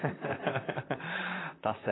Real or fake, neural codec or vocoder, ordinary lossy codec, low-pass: fake; vocoder, 44.1 kHz, 128 mel bands, Pupu-Vocoder; AAC, 16 kbps; 7.2 kHz